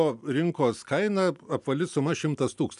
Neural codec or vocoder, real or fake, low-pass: none; real; 10.8 kHz